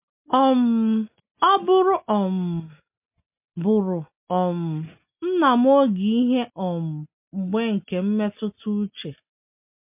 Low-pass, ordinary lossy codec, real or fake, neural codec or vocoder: 3.6 kHz; MP3, 32 kbps; real; none